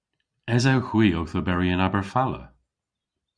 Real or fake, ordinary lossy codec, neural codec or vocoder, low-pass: real; Opus, 64 kbps; none; 9.9 kHz